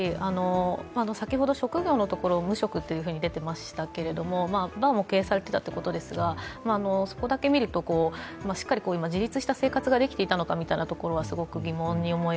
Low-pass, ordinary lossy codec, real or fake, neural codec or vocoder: none; none; real; none